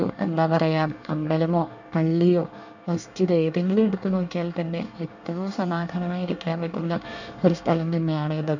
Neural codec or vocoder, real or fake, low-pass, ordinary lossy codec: codec, 24 kHz, 1 kbps, SNAC; fake; 7.2 kHz; none